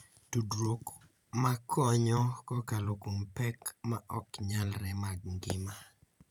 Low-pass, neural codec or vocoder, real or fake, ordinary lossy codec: none; vocoder, 44.1 kHz, 128 mel bands every 512 samples, BigVGAN v2; fake; none